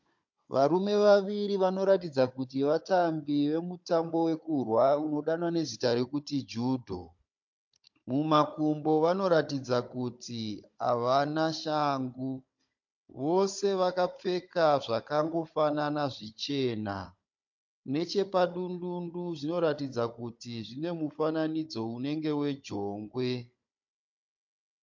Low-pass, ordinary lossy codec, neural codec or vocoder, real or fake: 7.2 kHz; MP3, 48 kbps; codec, 16 kHz, 16 kbps, FunCodec, trained on Chinese and English, 50 frames a second; fake